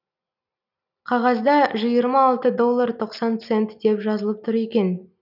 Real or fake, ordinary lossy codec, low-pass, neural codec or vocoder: real; none; 5.4 kHz; none